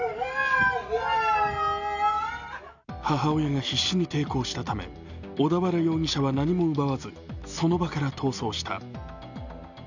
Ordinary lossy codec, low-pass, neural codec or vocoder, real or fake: none; 7.2 kHz; none; real